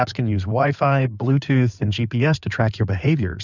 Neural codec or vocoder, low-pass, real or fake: codec, 16 kHz in and 24 kHz out, 2.2 kbps, FireRedTTS-2 codec; 7.2 kHz; fake